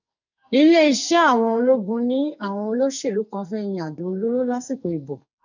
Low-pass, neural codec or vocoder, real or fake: 7.2 kHz; codec, 44.1 kHz, 2.6 kbps, SNAC; fake